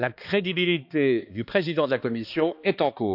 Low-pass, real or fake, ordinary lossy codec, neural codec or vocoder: 5.4 kHz; fake; none; codec, 16 kHz, 2 kbps, X-Codec, HuBERT features, trained on balanced general audio